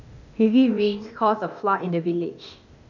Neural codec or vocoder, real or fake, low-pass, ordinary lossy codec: codec, 16 kHz, 0.8 kbps, ZipCodec; fake; 7.2 kHz; none